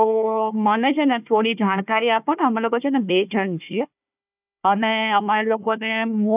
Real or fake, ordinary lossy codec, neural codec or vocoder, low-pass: fake; none; codec, 16 kHz, 1 kbps, FunCodec, trained on Chinese and English, 50 frames a second; 3.6 kHz